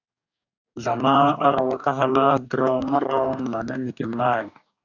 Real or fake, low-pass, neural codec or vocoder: fake; 7.2 kHz; codec, 44.1 kHz, 2.6 kbps, DAC